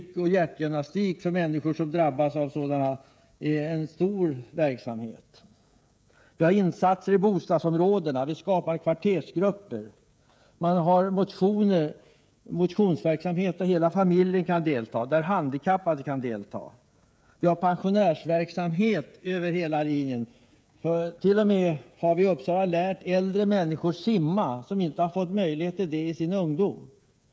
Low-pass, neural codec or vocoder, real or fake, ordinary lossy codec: none; codec, 16 kHz, 16 kbps, FreqCodec, smaller model; fake; none